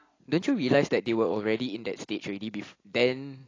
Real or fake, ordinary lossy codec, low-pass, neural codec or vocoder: real; AAC, 32 kbps; 7.2 kHz; none